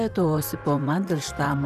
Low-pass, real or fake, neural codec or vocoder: 14.4 kHz; fake; vocoder, 44.1 kHz, 128 mel bands, Pupu-Vocoder